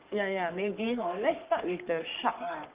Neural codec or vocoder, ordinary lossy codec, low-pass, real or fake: codec, 44.1 kHz, 3.4 kbps, Pupu-Codec; Opus, 32 kbps; 3.6 kHz; fake